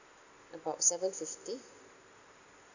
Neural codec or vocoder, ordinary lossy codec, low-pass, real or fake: none; none; 7.2 kHz; real